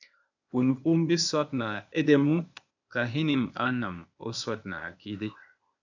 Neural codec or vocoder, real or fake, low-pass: codec, 16 kHz, 0.8 kbps, ZipCodec; fake; 7.2 kHz